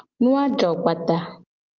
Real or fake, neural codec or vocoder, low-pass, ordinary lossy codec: real; none; 7.2 kHz; Opus, 32 kbps